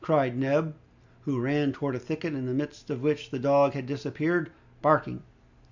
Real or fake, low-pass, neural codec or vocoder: real; 7.2 kHz; none